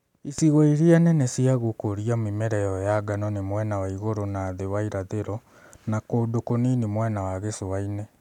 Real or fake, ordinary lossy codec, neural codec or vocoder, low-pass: real; none; none; 19.8 kHz